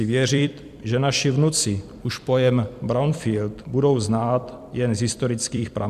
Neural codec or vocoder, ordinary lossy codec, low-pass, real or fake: vocoder, 44.1 kHz, 128 mel bands every 256 samples, BigVGAN v2; MP3, 96 kbps; 14.4 kHz; fake